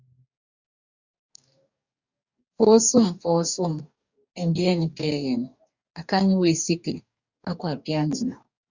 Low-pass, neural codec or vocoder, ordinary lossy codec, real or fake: 7.2 kHz; codec, 44.1 kHz, 2.6 kbps, DAC; Opus, 64 kbps; fake